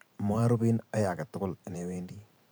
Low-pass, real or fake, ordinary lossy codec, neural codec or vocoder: none; fake; none; vocoder, 44.1 kHz, 128 mel bands every 512 samples, BigVGAN v2